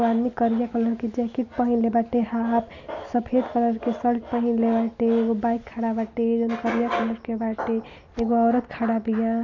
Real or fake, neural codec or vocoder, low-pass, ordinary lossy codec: real; none; 7.2 kHz; none